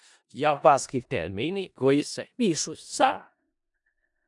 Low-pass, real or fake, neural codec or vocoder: 10.8 kHz; fake; codec, 16 kHz in and 24 kHz out, 0.4 kbps, LongCat-Audio-Codec, four codebook decoder